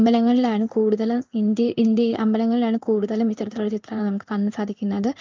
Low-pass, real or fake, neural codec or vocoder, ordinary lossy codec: 7.2 kHz; fake; codec, 16 kHz in and 24 kHz out, 1 kbps, XY-Tokenizer; Opus, 24 kbps